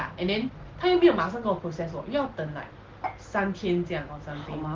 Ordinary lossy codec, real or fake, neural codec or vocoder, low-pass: Opus, 16 kbps; real; none; 7.2 kHz